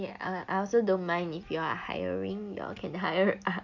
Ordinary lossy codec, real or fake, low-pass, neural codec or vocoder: none; real; 7.2 kHz; none